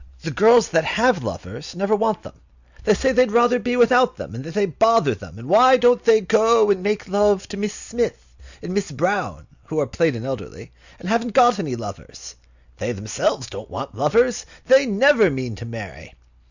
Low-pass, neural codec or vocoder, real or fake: 7.2 kHz; none; real